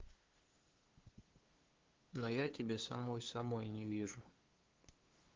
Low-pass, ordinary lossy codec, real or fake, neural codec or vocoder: 7.2 kHz; Opus, 16 kbps; fake; codec, 16 kHz, 2 kbps, FunCodec, trained on LibriTTS, 25 frames a second